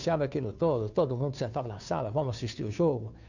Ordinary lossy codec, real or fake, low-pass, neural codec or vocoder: none; fake; 7.2 kHz; codec, 16 kHz, 2 kbps, FunCodec, trained on Chinese and English, 25 frames a second